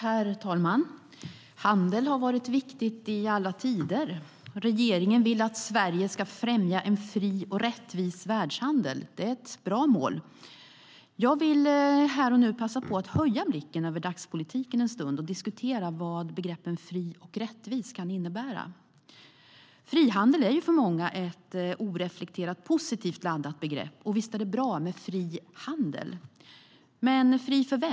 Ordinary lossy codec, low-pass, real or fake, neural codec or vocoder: none; none; real; none